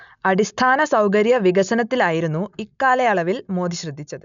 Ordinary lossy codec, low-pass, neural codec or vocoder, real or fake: none; 7.2 kHz; none; real